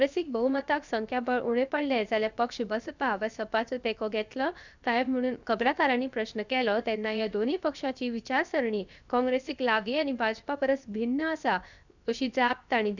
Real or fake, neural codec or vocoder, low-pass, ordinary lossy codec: fake; codec, 16 kHz, 0.7 kbps, FocalCodec; 7.2 kHz; none